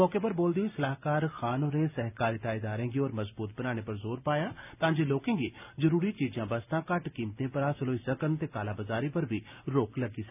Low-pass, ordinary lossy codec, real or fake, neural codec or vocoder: 3.6 kHz; none; real; none